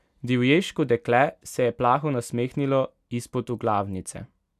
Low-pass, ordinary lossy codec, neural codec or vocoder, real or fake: 14.4 kHz; none; none; real